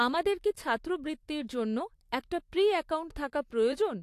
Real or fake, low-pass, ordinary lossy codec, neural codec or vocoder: fake; 14.4 kHz; AAC, 64 kbps; vocoder, 44.1 kHz, 128 mel bands, Pupu-Vocoder